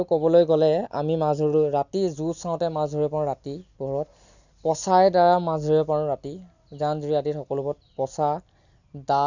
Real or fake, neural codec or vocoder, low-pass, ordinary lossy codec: real; none; 7.2 kHz; none